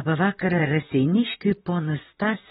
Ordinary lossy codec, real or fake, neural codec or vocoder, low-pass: AAC, 16 kbps; fake; vocoder, 44.1 kHz, 128 mel bands, Pupu-Vocoder; 19.8 kHz